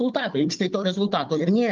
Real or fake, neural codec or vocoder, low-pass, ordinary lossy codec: fake; codec, 16 kHz, 4 kbps, FunCodec, trained on Chinese and English, 50 frames a second; 7.2 kHz; Opus, 32 kbps